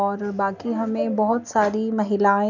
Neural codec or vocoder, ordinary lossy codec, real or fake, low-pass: none; none; real; 7.2 kHz